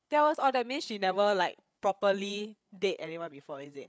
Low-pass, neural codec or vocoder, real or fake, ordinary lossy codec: none; codec, 16 kHz, 8 kbps, FreqCodec, larger model; fake; none